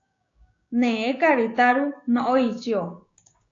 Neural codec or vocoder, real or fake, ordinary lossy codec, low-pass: codec, 16 kHz, 6 kbps, DAC; fake; AAC, 48 kbps; 7.2 kHz